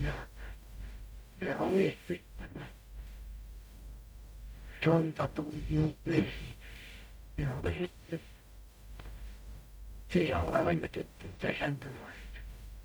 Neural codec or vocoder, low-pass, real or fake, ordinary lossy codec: codec, 44.1 kHz, 0.9 kbps, DAC; none; fake; none